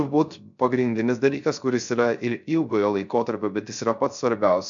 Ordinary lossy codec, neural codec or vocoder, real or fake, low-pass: MP3, 64 kbps; codec, 16 kHz, 0.3 kbps, FocalCodec; fake; 7.2 kHz